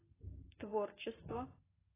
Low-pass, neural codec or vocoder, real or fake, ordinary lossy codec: 3.6 kHz; none; real; AAC, 16 kbps